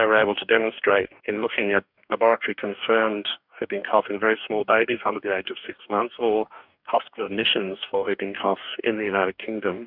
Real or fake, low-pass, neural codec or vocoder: fake; 5.4 kHz; codec, 44.1 kHz, 2.6 kbps, DAC